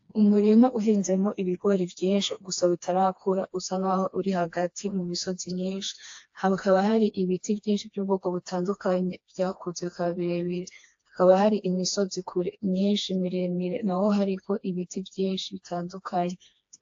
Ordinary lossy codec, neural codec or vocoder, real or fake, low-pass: AAC, 48 kbps; codec, 16 kHz, 2 kbps, FreqCodec, smaller model; fake; 7.2 kHz